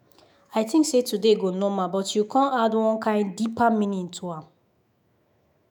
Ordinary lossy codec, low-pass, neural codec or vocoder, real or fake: none; none; autoencoder, 48 kHz, 128 numbers a frame, DAC-VAE, trained on Japanese speech; fake